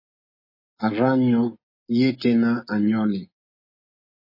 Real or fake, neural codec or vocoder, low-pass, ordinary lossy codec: real; none; 5.4 kHz; MP3, 24 kbps